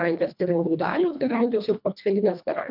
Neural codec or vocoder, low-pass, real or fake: codec, 24 kHz, 1.5 kbps, HILCodec; 5.4 kHz; fake